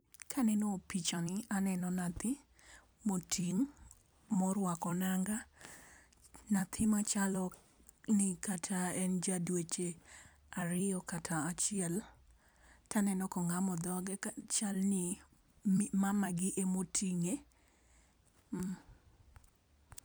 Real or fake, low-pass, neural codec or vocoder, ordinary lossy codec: fake; none; vocoder, 44.1 kHz, 128 mel bands every 256 samples, BigVGAN v2; none